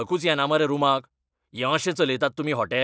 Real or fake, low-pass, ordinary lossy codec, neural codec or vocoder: real; none; none; none